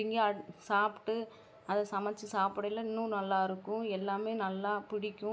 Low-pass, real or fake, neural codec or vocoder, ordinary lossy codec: none; real; none; none